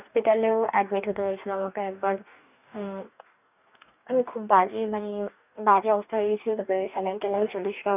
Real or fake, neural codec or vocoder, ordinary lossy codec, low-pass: fake; codec, 44.1 kHz, 2.6 kbps, DAC; none; 3.6 kHz